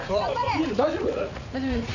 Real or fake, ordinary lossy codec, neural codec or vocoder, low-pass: fake; none; vocoder, 44.1 kHz, 80 mel bands, Vocos; 7.2 kHz